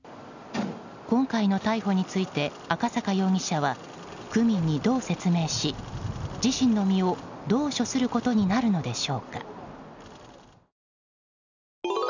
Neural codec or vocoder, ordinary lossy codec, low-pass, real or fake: none; none; 7.2 kHz; real